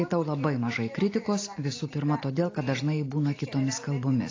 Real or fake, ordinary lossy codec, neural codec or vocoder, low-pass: real; AAC, 32 kbps; none; 7.2 kHz